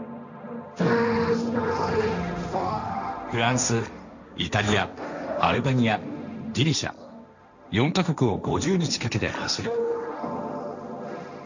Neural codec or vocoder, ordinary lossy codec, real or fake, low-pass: codec, 16 kHz, 1.1 kbps, Voila-Tokenizer; none; fake; 7.2 kHz